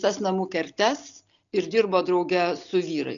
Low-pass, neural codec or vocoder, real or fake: 7.2 kHz; none; real